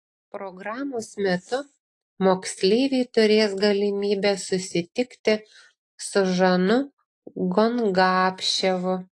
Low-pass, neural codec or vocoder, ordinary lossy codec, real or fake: 10.8 kHz; none; AAC, 48 kbps; real